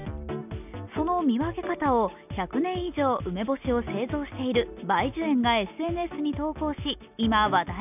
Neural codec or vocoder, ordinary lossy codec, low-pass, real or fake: none; none; 3.6 kHz; real